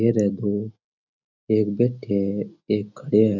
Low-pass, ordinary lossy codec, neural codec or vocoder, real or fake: 7.2 kHz; none; none; real